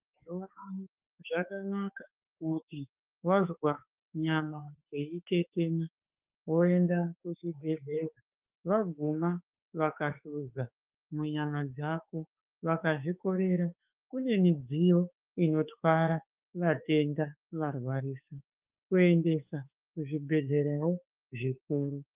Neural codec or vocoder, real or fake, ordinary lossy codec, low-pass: autoencoder, 48 kHz, 32 numbers a frame, DAC-VAE, trained on Japanese speech; fake; Opus, 32 kbps; 3.6 kHz